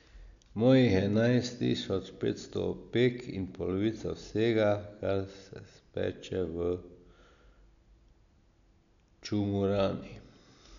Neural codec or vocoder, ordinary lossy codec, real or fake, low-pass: none; none; real; 7.2 kHz